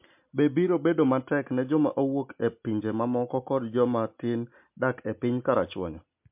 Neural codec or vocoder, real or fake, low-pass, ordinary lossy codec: none; real; 3.6 kHz; MP3, 32 kbps